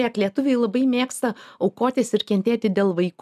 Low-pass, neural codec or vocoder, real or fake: 14.4 kHz; none; real